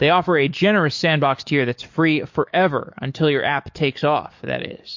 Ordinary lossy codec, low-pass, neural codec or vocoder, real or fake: MP3, 48 kbps; 7.2 kHz; codec, 44.1 kHz, 7.8 kbps, DAC; fake